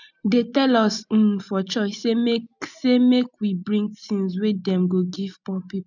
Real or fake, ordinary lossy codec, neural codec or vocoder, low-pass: real; none; none; 7.2 kHz